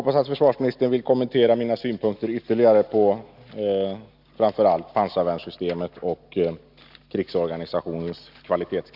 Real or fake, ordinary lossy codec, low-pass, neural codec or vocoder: real; Opus, 64 kbps; 5.4 kHz; none